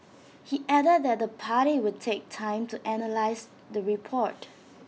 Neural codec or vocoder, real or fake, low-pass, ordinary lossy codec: none; real; none; none